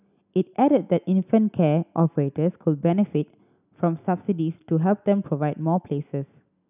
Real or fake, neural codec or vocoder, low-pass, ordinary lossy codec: real; none; 3.6 kHz; none